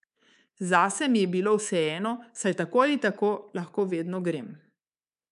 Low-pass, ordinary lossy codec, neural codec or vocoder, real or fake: 10.8 kHz; none; codec, 24 kHz, 3.1 kbps, DualCodec; fake